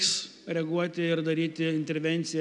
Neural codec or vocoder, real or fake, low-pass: none; real; 10.8 kHz